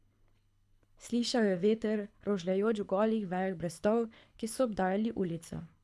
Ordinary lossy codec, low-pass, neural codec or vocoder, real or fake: none; none; codec, 24 kHz, 6 kbps, HILCodec; fake